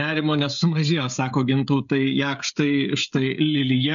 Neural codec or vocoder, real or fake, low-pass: codec, 16 kHz, 16 kbps, FreqCodec, smaller model; fake; 7.2 kHz